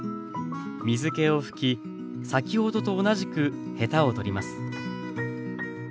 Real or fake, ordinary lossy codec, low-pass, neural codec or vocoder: real; none; none; none